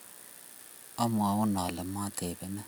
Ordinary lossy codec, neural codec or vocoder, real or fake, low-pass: none; none; real; none